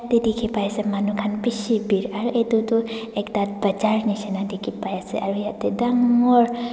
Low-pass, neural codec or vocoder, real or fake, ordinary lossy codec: none; none; real; none